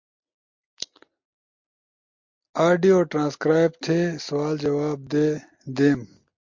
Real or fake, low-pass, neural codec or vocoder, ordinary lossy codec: real; 7.2 kHz; none; MP3, 48 kbps